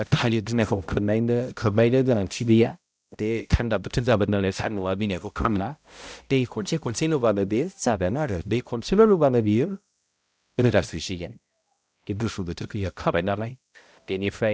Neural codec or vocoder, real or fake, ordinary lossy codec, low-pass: codec, 16 kHz, 0.5 kbps, X-Codec, HuBERT features, trained on balanced general audio; fake; none; none